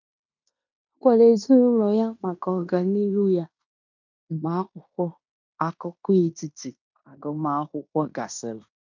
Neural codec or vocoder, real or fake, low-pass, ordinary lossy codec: codec, 16 kHz in and 24 kHz out, 0.9 kbps, LongCat-Audio-Codec, fine tuned four codebook decoder; fake; 7.2 kHz; none